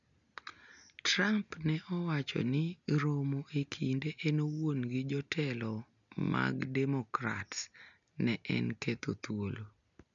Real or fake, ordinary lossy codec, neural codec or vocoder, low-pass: real; none; none; 7.2 kHz